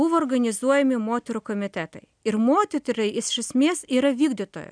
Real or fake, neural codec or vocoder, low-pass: real; none; 9.9 kHz